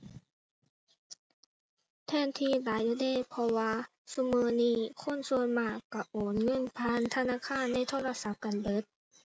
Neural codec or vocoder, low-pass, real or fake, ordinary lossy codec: none; none; real; none